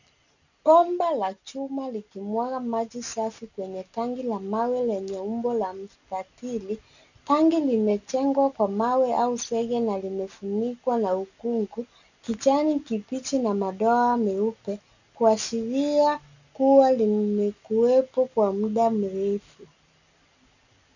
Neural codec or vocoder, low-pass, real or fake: none; 7.2 kHz; real